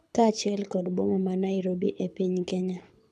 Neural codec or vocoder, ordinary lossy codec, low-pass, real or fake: codec, 24 kHz, 6 kbps, HILCodec; none; none; fake